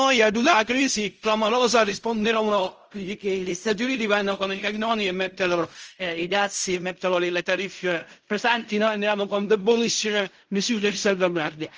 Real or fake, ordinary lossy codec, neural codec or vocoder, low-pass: fake; Opus, 24 kbps; codec, 16 kHz in and 24 kHz out, 0.4 kbps, LongCat-Audio-Codec, fine tuned four codebook decoder; 7.2 kHz